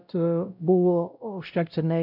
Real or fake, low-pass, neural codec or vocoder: fake; 5.4 kHz; codec, 16 kHz, 0.5 kbps, X-Codec, WavLM features, trained on Multilingual LibriSpeech